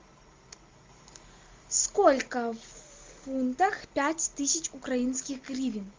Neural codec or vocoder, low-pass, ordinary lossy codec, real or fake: none; 7.2 kHz; Opus, 32 kbps; real